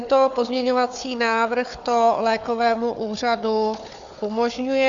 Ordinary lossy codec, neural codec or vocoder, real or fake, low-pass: AAC, 64 kbps; codec, 16 kHz, 4 kbps, FunCodec, trained on Chinese and English, 50 frames a second; fake; 7.2 kHz